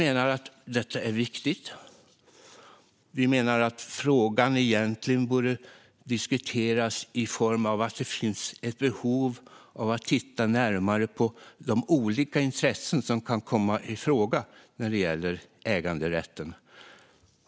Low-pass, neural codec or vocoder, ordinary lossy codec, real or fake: none; none; none; real